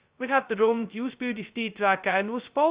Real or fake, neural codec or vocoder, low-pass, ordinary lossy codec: fake; codec, 16 kHz, 0.2 kbps, FocalCodec; 3.6 kHz; Opus, 64 kbps